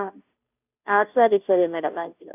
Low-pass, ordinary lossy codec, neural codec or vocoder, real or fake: 3.6 kHz; none; codec, 16 kHz, 0.5 kbps, FunCodec, trained on Chinese and English, 25 frames a second; fake